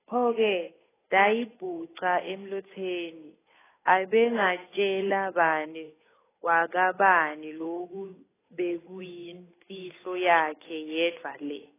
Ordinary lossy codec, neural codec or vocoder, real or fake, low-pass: AAC, 16 kbps; codec, 16 kHz, 8 kbps, FunCodec, trained on Chinese and English, 25 frames a second; fake; 3.6 kHz